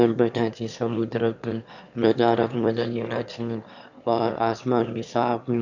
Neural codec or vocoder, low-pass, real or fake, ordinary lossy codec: autoencoder, 22.05 kHz, a latent of 192 numbers a frame, VITS, trained on one speaker; 7.2 kHz; fake; none